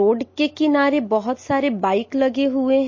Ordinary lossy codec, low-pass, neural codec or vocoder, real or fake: MP3, 32 kbps; 7.2 kHz; none; real